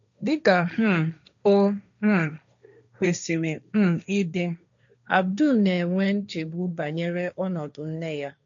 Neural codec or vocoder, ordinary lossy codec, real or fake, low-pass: codec, 16 kHz, 1.1 kbps, Voila-Tokenizer; none; fake; 7.2 kHz